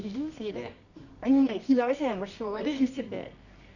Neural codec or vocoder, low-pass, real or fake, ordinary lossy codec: codec, 24 kHz, 0.9 kbps, WavTokenizer, medium music audio release; 7.2 kHz; fake; none